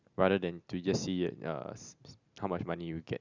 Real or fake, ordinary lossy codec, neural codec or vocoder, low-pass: real; none; none; 7.2 kHz